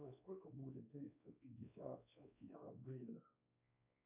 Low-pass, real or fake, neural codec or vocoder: 3.6 kHz; fake; codec, 16 kHz, 2 kbps, X-Codec, WavLM features, trained on Multilingual LibriSpeech